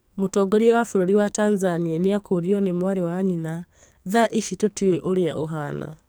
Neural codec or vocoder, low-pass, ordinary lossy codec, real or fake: codec, 44.1 kHz, 2.6 kbps, SNAC; none; none; fake